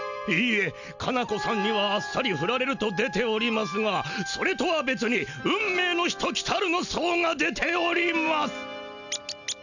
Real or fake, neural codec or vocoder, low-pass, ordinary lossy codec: real; none; 7.2 kHz; none